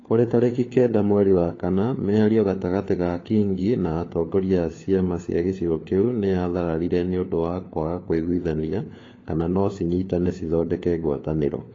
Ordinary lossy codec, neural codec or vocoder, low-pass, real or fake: AAC, 32 kbps; codec, 16 kHz, 4 kbps, FunCodec, trained on LibriTTS, 50 frames a second; 7.2 kHz; fake